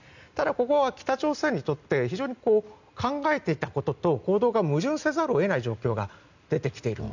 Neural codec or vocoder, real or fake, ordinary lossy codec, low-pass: none; real; none; 7.2 kHz